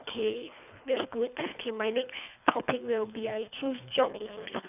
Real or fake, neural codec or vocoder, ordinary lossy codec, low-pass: fake; codec, 24 kHz, 3 kbps, HILCodec; none; 3.6 kHz